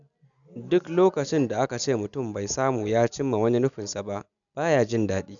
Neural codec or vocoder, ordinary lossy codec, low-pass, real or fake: none; none; 7.2 kHz; real